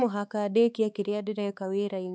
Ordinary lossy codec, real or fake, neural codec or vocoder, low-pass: none; fake; codec, 16 kHz, 4 kbps, X-Codec, HuBERT features, trained on balanced general audio; none